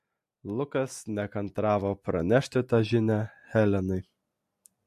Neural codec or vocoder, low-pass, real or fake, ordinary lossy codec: none; 14.4 kHz; real; MP3, 64 kbps